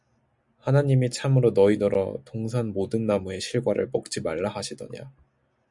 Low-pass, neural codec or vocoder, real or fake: 10.8 kHz; none; real